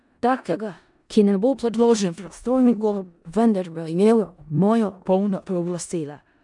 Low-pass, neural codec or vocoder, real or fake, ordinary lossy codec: 10.8 kHz; codec, 16 kHz in and 24 kHz out, 0.4 kbps, LongCat-Audio-Codec, four codebook decoder; fake; none